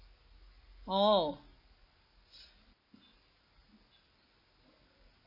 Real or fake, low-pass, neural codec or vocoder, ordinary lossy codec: real; 5.4 kHz; none; AAC, 48 kbps